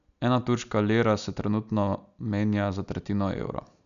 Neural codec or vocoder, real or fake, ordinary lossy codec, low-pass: none; real; none; 7.2 kHz